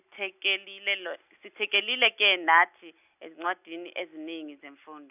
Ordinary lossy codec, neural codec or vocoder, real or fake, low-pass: none; none; real; 3.6 kHz